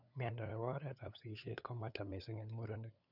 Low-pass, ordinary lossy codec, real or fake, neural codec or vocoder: 5.4 kHz; none; fake; codec, 16 kHz, 8 kbps, FunCodec, trained on LibriTTS, 25 frames a second